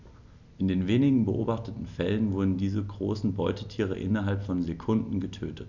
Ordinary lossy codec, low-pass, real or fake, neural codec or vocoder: none; 7.2 kHz; real; none